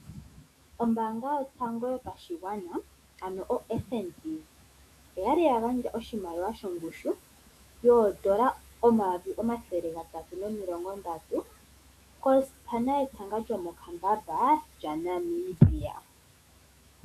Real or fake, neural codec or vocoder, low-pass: fake; autoencoder, 48 kHz, 128 numbers a frame, DAC-VAE, trained on Japanese speech; 14.4 kHz